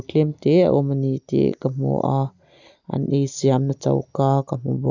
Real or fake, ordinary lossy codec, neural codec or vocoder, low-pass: real; none; none; 7.2 kHz